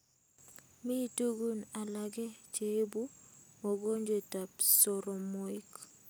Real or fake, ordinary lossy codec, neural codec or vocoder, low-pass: real; none; none; none